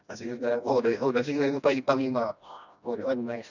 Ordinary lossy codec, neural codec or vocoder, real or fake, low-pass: none; codec, 16 kHz, 1 kbps, FreqCodec, smaller model; fake; 7.2 kHz